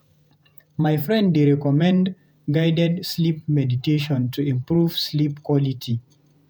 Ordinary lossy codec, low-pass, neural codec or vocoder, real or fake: none; none; vocoder, 48 kHz, 128 mel bands, Vocos; fake